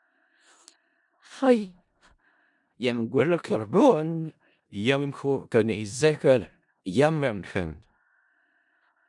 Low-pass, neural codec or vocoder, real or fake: 10.8 kHz; codec, 16 kHz in and 24 kHz out, 0.4 kbps, LongCat-Audio-Codec, four codebook decoder; fake